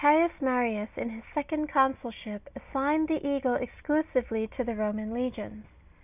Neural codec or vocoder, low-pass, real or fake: none; 3.6 kHz; real